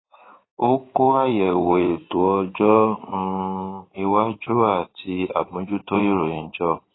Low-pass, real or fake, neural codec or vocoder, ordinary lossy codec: 7.2 kHz; fake; codec, 16 kHz in and 24 kHz out, 2.2 kbps, FireRedTTS-2 codec; AAC, 16 kbps